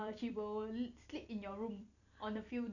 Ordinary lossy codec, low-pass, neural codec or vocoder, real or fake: none; 7.2 kHz; vocoder, 44.1 kHz, 128 mel bands every 256 samples, BigVGAN v2; fake